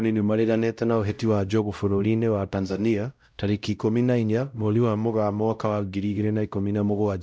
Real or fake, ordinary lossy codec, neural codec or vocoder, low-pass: fake; none; codec, 16 kHz, 0.5 kbps, X-Codec, WavLM features, trained on Multilingual LibriSpeech; none